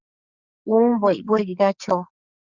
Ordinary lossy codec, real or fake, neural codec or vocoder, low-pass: Opus, 64 kbps; fake; codec, 44.1 kHz, 2.6 kbps, SNAC; 7.2 kHz